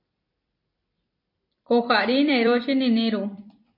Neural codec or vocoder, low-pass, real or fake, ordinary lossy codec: vocoder, 44.1 kHz, 128 mel bands every 256 samples, BigVGAN v2; 5.4 kHz; fake; MP3, 32 kbps